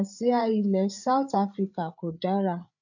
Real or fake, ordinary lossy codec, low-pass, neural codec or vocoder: fake; none; 7.2 kHz; codec, 16 kHz, 8 kbps, FreqCodec, larger model